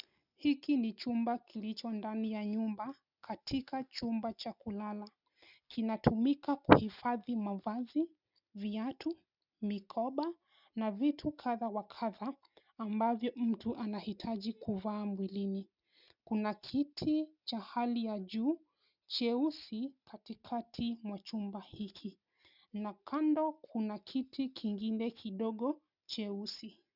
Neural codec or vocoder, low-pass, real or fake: none; 5.4 kHz; real